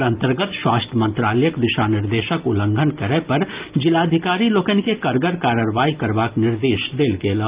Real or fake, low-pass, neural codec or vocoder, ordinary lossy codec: real; 3.6 kHz; none; Opus, 32 kbps